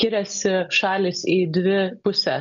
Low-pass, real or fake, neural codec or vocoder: 7.2 kHz; real; none